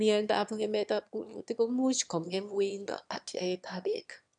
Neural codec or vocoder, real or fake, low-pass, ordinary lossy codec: autoencoder, 22.05 kHz, a latent of 192 numbers a frame, VITS, trained on one speaker; fake; 9.9 kHz; none